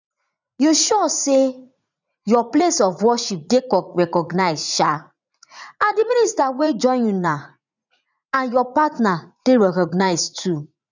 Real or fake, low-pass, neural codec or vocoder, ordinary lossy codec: fake; 7.2 kHz; vocoder, 44.1 kHz, 80 mel bands, Vocos; none